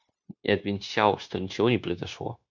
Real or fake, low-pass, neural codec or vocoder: fake; 7.2 kHz; codec, 16 kHz, 0.9 kbps, LongCat-Audio-Codec